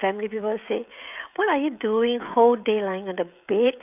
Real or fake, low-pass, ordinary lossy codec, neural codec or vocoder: fake; 3.6 kHz; none; codec, 16 kHz, 16 kbps, FreqCodec, smaller model